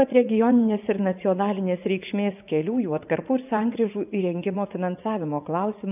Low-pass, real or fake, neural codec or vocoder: 3.6 kHz; fake; vocoder, 44.1 kHz, 80 mel bands, Vocos